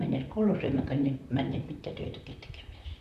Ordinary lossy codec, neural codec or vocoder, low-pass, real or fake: none; vocoder, 44.1 kHz, 128 mel bands, Pupu-Vocoder; 14.4 kHz; fake